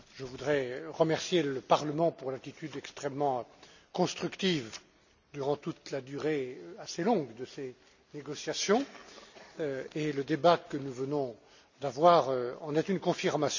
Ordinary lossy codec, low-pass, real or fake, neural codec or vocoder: none; 7.2 kHz; real; none